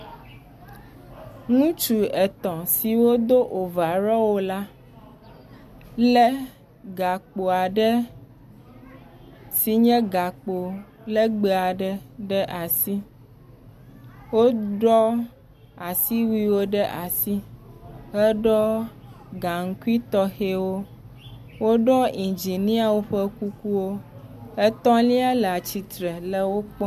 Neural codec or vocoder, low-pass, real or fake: none; 14.4 kHz; real